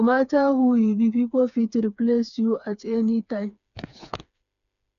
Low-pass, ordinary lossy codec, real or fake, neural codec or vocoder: 7.2 kHz; none; fake; codec, 16 kHz, 4 kbps, FreqCodec, smaller model